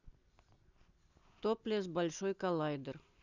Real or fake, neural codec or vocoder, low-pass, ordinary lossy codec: real; none; 7.2 kHz; none